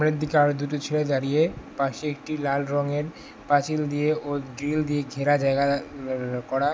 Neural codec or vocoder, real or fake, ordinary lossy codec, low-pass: none; real; none; none